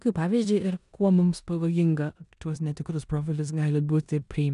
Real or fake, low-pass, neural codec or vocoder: fake; 10.8 kHz; codec, 16 kHz in and 24 kHz out, 0.9 kbps, LongCat-Audio-Codec, four codebook decoder